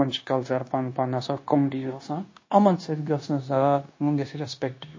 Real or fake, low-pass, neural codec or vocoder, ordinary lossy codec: fake; 7.2 kHz; codec, 16 kHz, 0.9 kbps, LongCat-Audio-Codec; MP3, 32 kbps